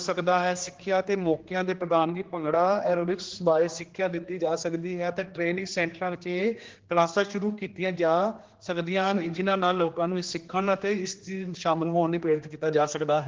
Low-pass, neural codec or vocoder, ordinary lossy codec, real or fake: 7.2 kHz; codec, 16 kHz, 2 kbps, X-Codec, HuBERT features, trained on general audio; Opus, 16 kbps; fake